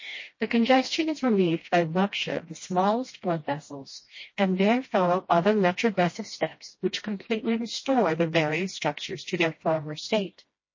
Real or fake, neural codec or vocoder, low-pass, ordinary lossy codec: fake; codec, 16 kHz, 1 kbps, FreqCodec, smaller model; 7.2 kHz; MP3, 32 kbps